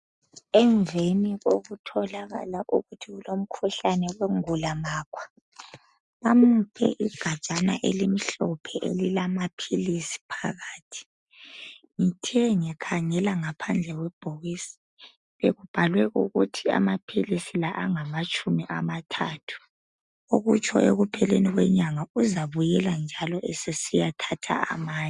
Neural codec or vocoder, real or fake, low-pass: none; real; 10.8 kHz